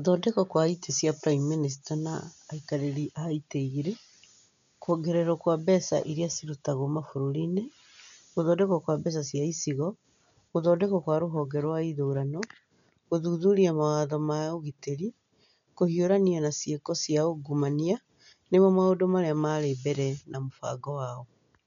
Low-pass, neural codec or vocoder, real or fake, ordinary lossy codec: 7.2 kHz; none; real; none